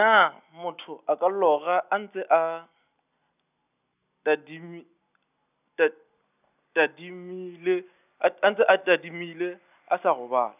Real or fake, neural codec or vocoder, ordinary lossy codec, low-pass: real; none; none; 3.6 kHz